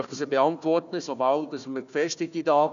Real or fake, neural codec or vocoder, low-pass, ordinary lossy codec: fake; codec, 16 kHz, 1 kbps, FunCodec, trained on Chinese and English, 50 frames a second; 7.2 kHz; none